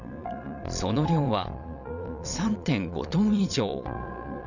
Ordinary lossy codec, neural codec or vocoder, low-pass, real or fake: none; vocoder, 22.05 kHz, 80 mel bands, Vocos; 7.2 kHz; fake